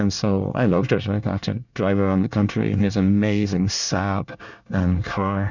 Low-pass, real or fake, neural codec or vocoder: 7.2 kHz; fake; codec, 24 kHz, 1 kbps, SNAC